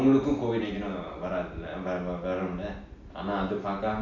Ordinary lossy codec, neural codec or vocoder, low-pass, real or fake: none; none; 7.2 kHz; real